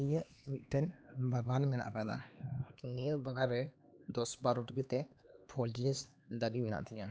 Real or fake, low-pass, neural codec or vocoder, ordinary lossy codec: fake; none; codec, 16 kHz, 2 kbps, X-Codec, HuBERT features, trained on LibriSpeech; none